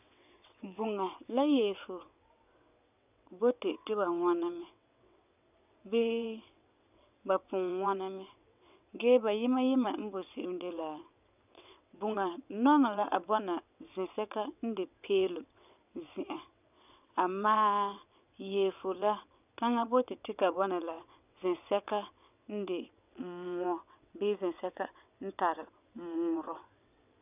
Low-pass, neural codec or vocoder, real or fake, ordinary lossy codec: 3.6 kHz; vocoder, 22.05 kHz, 80 mel bands, WaveNeXt; fake; none